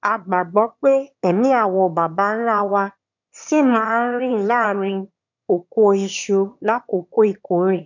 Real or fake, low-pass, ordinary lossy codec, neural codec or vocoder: fake; 7.2 kHz; none; autoencoder, 22.05 kHz, a latent of 192 numbers a frame, VITS, trained on one speaker